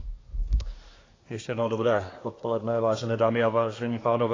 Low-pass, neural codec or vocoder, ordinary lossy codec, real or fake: 7.2 kHz; codec, 24 kHz, 1 kbps, SNAC; AAC, 32 kbps; fake